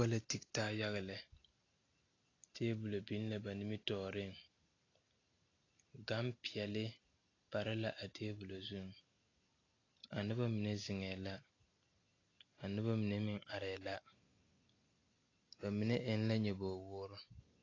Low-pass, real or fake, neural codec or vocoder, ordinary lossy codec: 7.2 kHz; real; none; AAC, 32 kbps